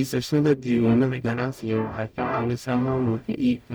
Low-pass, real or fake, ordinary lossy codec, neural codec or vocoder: none; fake; none; codec, 44.1 kHz, 0.9 kbps, DAC